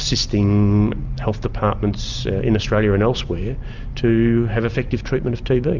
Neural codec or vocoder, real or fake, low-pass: none; real; 7.2 kHz